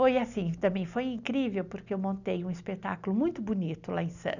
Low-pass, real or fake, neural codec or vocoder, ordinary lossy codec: 7.2 kHz; real; none; none